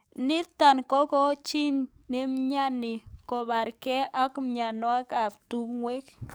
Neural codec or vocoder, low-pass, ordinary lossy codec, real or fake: codec, 44.1 kHz, 7.8 kbps, DAC; none; none; fake